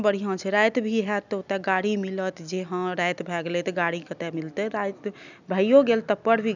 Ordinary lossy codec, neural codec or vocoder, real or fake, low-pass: none; none; real; 7.2 kHz